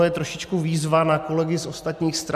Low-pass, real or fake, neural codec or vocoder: 14.4 kHz; real; none